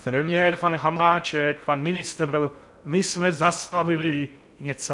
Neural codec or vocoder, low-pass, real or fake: codec, 16 kHz in and 24 kHz out, 0.6 kbps, FocalCodec, streaming, 4096 codes; 10.8 kHz; fake